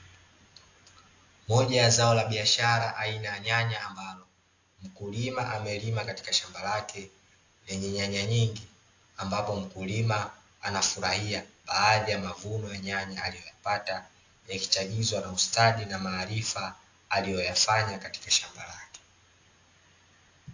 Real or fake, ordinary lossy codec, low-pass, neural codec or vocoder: real; AAC, 48 kbps; 7.2 kHz; none